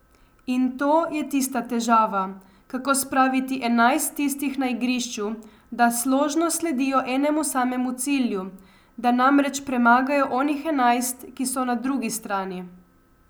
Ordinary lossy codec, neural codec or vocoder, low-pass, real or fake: none; none; none; real